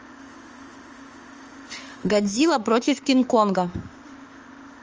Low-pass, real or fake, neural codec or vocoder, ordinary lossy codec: 7.2 kHz; fake; autoencoder, 48 kHz, 32 numbers a frame, DAC-VAE, trained on Japanese speech; Opus, 24 kbps